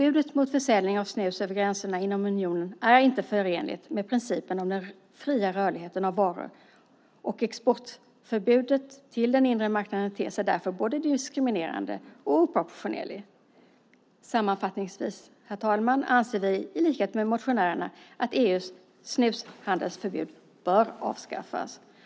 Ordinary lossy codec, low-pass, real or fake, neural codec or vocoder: none; none; real; none